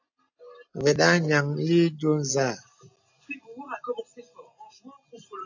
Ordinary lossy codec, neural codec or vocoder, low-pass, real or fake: AAC, 48 kbps; none; 7.2 kHz; real